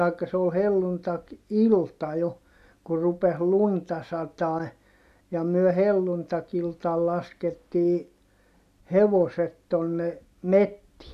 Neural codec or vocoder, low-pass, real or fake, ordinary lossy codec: none; 14.4 kHz; real; AAC, 96 kbps